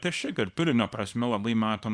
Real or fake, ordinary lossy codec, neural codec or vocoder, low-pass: fake; Opus, 64 kbps; codec, 24 kHz, 0.9 kbps, WavTokenizer, small release; 9.9 kHz